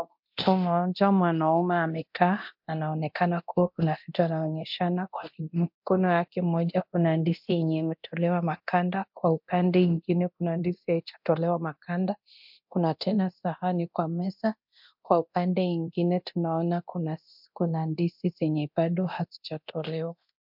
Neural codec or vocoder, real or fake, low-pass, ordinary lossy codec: codec, 24 kHz, 0.9 kbps, DualCodec; fake; 5.4 kHz; MP3, 48 kbps